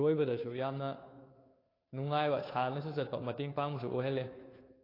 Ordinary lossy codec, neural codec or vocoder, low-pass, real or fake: AAC, 24 kbps; codec, 16 kHz, 2 kbps, FunCodec, trained on Chinese and English, 25 frames a second; 5.4 kHz; fake